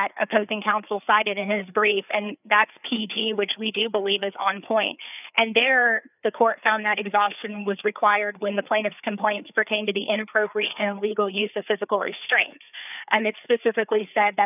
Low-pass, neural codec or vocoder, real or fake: 3.6 kHz; codec, 16 kHz, 4 kbps, FreqCodec, larger model; fake